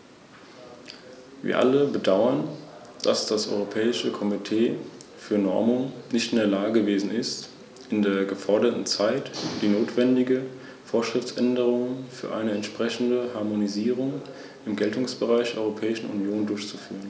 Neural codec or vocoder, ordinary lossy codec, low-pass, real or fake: none; none; none; real